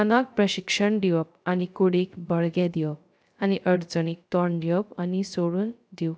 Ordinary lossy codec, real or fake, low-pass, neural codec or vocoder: none; fake; none; codec, 16 kHz, 0.3 kbps, FocalCodec